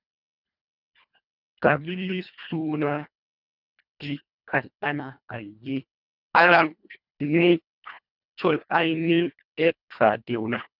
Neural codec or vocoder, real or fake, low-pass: codec, 24 kHz, 1.5 kbps, HILCodec; fake; 5.4 kHz